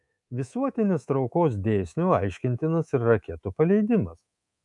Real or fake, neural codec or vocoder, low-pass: fake; codec, 24 kHz, 3.1 kbps, DualCodec; 10.8 kHz